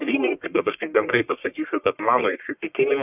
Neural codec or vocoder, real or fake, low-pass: codec, 44.1 kHz, 1.7 kbps, Pupu-Codec; fake; 3.6 kHz